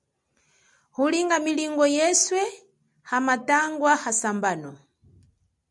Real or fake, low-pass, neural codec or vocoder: real; 10.8 kHz; none